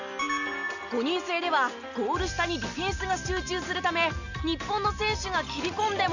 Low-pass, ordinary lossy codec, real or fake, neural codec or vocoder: 7.2 kHz; none; real; none